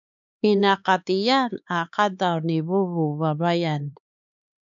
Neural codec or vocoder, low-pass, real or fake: codec, 16 kHz, 4 kbps, X-Codec, HuBERT features, trained on LibriSpeech; 7.2 kHz; fake